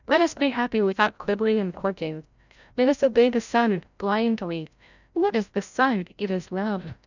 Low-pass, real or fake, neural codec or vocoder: 7.2 kHz; fake; codec, 16 kHz, 0.5 kbps, FreqCodec, larger model